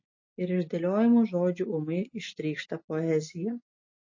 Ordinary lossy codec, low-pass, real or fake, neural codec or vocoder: MP3, 32 kbps; 7.2 kHz; real; none